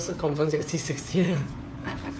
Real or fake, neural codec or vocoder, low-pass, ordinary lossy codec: fake; codec, 16 kHz, 4 kbps, FunCodec, trained on LibriTTS, 50 frames a second; none; none